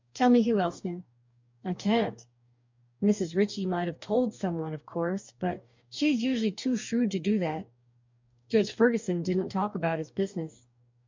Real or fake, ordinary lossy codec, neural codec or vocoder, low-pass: fake; MP3, 64 kbps; codec, 44.1 kHz, 2.6 kbps, DAC; 7.2 kHz